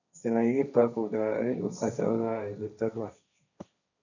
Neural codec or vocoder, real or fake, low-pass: codec, 16 kHz, 1.1 kbps, Voila-Tokenizer; fake; 7.2 kHz